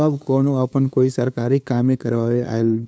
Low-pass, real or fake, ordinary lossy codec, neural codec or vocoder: none; fake; none; codec, 16 kHz, 2 kbps, FunCodec, trained on LibriTTS, 25 frames a second